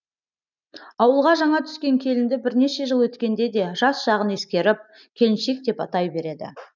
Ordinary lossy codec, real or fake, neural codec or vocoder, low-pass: none; real; none; 7.2 kHz